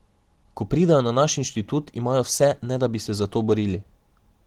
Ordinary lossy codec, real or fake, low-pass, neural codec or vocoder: Opus, 16 kbps; real; 19.8 kHz; none